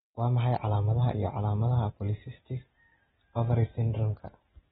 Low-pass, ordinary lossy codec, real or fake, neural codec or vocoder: 10.8 kHz; AAC, 16 kbps; real; none